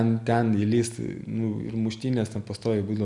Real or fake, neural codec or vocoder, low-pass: real; none; 10.8 kHz